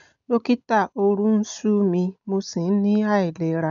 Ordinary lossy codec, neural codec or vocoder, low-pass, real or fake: none; none; 7.2 kHz; real